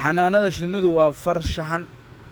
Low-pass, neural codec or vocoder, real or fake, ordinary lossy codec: none; codec, 44.1 kHz, 2.6 kbps, SNAC; fake; none